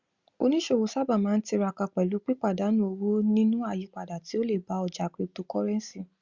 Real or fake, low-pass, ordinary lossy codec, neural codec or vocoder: real; 7.2 kHz; Opus, 64 kbps; none